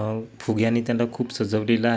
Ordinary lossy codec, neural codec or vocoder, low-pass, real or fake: none; none; none; real